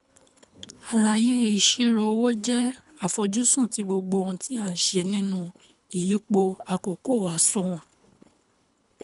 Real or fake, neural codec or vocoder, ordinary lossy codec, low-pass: fake; codec, 24 kHz, 3 kbps, HILCodec; none; 10.8 kHz